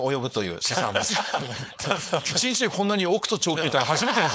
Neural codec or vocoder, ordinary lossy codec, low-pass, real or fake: codec, 16 kHz, 4.8 kbps, FACodec; none; none; fake